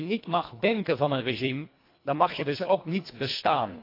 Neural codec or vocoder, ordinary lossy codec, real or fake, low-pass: codec, 24 kHz, 1.5 kbps, HILCodec; AAC, 32 kbps; fake; 5.4 kHz